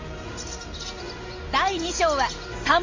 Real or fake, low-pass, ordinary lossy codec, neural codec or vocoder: real; 7.2 kHz; Opus, 32 kbps; none